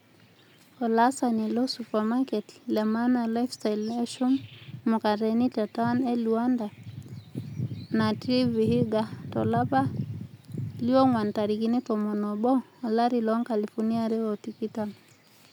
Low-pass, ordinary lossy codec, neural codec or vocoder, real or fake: 19.8 kHz; none; none; real